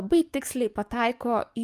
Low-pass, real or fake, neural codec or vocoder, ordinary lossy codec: 14.4 kHz; fake; codec, 44.1 kHz, 7.8 kbps, DAC; Opus, 32 kbps